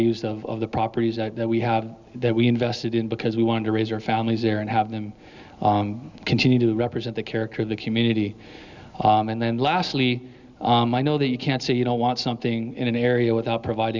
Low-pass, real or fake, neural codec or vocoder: 7.2 kHz; real; none